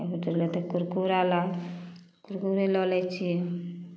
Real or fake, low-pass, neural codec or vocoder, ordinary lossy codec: real; none; none; none